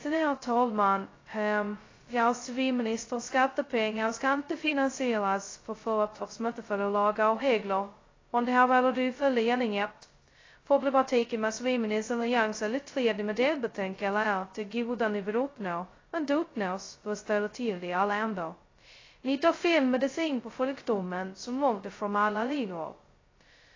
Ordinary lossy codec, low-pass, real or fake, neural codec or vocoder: AAC, 32 kbps; 7.2 kHz; fake; codec, 16 kHz, 0.2 kbps, FocalCodec